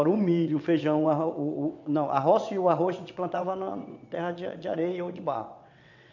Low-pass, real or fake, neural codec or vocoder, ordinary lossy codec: 7.2 kHz; fake; vocoder, 22.05 kHz, 80 mel bands, WaveNeXt; none